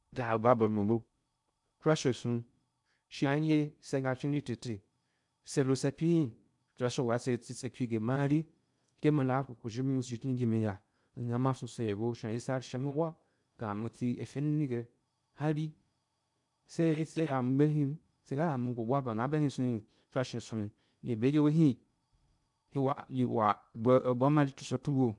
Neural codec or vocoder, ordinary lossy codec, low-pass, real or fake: codec, 16 kHz in and 24 kHz out, 0.6 kbps, FocalCodec, streaming, 2048 codes; none; 10.8 kHz; fake